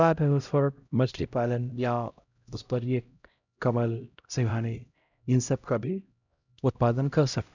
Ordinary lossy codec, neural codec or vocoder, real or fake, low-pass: none; codec, 16 kHz, 0.5 kbps, X-Codec, HuBERT features, trained on LibriSpeech; fake; 7.2 kHz